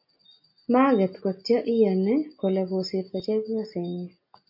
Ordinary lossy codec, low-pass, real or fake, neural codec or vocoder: AAC, 48 kbps; 5.4 kHz; real; none